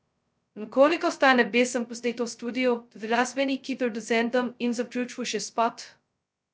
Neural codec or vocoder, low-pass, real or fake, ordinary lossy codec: codec, 16 kHz, 0.2 kbps, FocalCodec; none; fake; none